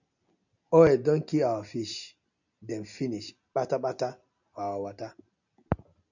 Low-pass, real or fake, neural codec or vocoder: 7.2 kHz; real; none